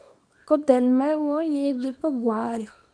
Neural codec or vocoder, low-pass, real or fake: codec, 24 kHz, 0.9 kbps, WavTokenizer, small release; 9.9 kHz; fake